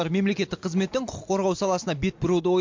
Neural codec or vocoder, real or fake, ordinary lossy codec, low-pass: none; real; MP3, 48 kbps; 7.2 kHz